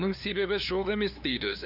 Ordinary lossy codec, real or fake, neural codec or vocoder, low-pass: none; fake; codec, 16 kHz, 2 kbps, FunCodec, trained on LibriTTS, 25 frames a second; 5.4 kHz